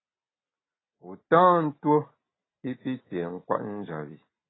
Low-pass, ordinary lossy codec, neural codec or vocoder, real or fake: 7.2 kHz; AAC, 16 kbps; none; real